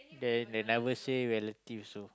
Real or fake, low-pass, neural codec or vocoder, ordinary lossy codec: real; none; none; none